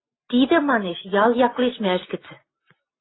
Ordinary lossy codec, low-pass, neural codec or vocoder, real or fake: AAC, 16 kbps; 7.2 kHz; none; real